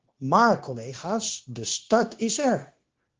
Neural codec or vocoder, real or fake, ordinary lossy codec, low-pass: codec, 16 kHz, 0.9 kbps, LongCat-Audio-Codec; fake; Opus, 16 kbps; 7.2 kHz